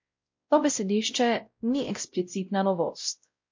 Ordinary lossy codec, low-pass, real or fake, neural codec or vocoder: MP3, 48 kbps; 7.2 kHz; fake; codec, 16 kHz, 0.5 kbps, X-Codec, WavLM features, trained on Multilingual LibriSpeech